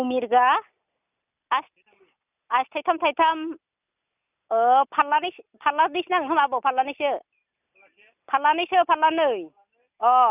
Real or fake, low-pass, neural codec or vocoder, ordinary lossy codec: real; 3.6 kHz; none; none